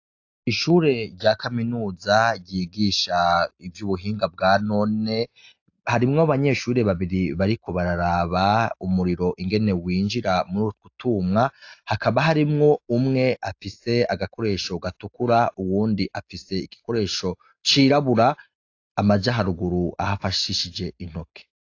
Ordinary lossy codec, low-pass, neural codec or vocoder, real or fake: AAC, 48 kbps; 7.2 kHz; none; real